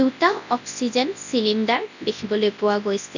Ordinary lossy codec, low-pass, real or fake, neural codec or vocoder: none; 7.2 kHz; fake; codec, 24 kHz, 0.9 kbps, WavTokenizer, large speech release